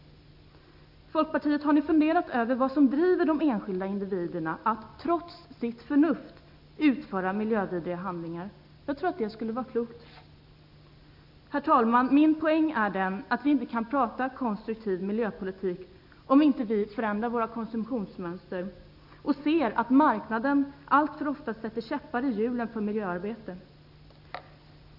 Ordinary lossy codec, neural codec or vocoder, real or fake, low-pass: none; none; real; 5.4 kHz